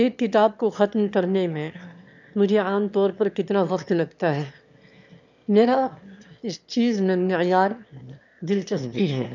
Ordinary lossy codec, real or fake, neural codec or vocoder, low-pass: none; fake; autoencoder, 22.05 kHz, a latent of 192 numbers a frame, VITS, trained on one speaker; 7.2 kHz